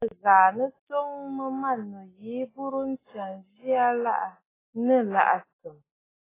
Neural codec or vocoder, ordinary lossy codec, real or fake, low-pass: none; AAC, 16 kbps; real; 3.6 kHz